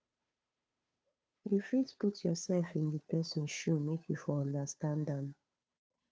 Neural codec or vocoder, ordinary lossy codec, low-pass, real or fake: codec, 16 kHz, 2 kbps, FunCodec, trained on Chinese and English, 25 frames a second; none; none; fake